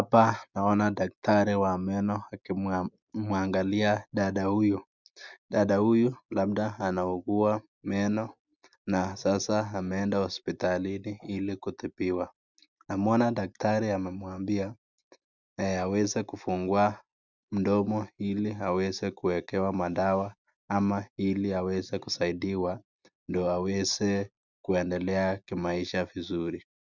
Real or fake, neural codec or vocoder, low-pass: real; none; 7.2 kHz